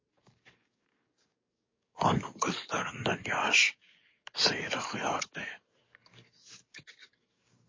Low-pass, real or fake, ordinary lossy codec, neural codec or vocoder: 7.2 kHz; fake; MP3, 32 kbps; codec, 44.1 kHz, 7.8 kbps, DAC